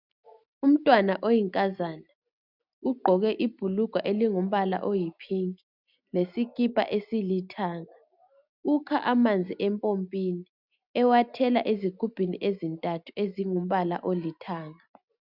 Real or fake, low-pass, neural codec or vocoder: real; 5.4 kHz; none